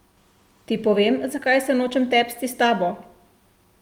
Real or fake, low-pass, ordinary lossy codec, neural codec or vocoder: real; 19.8 kHz; Opus, 32 kbps; none